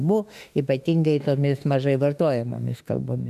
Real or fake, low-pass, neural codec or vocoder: fake; 14.4 kHz; autoencoder, 48 kHz, 32 numbers a frame, DAC-VAE, trained on Japanese speech